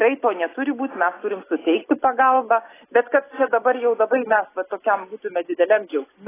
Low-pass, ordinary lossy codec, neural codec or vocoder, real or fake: 3.6 kHz; AAC, 16 kbps; none; real